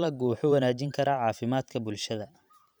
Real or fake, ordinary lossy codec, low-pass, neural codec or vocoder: fake; none; none; vocoder, 44.1 kHz, 128 mel bands every 512 samples, BigVGAN v2